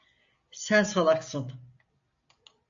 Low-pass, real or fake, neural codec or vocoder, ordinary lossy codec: 7.2 kHz; real; none; AAC, 64 kbps